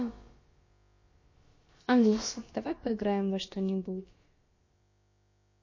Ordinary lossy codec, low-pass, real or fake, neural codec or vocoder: MP3, 32 kbps; 7.2 kHz; fake; codec, 16 kHz, about 1 kbps, DyCAST, with the encoder's durations